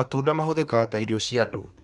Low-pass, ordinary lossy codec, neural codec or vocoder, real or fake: 10.8 kHz; none; codec, 24 kHz, 1 kbps, SNAC; fake